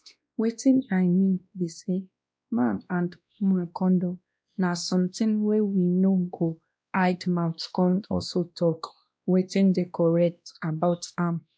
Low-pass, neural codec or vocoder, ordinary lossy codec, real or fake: none; codec, 16 kHz, 1 kbps, X-Codec, WavLM features, trained on Multilingual LibriSpeech; none; fake